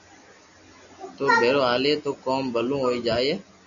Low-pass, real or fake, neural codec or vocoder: 7.2 kHz; real; none